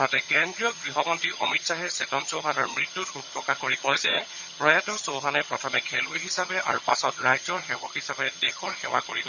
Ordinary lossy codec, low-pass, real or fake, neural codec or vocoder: none; 7.2 kHz; fake; vocoder, 22.05 kHz, 80 mel bands, HiFi-GAN